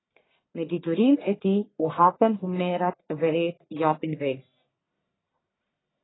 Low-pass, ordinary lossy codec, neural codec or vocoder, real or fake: 7.2 kHz; AAC, 16 kbps; codec, 44.1 kHz, 1.7 kbps, Pupu-Codec; fake